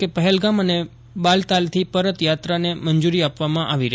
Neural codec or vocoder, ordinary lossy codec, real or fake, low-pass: none; none; real; none